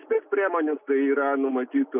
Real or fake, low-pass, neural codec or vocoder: real; 3.6 kHz; none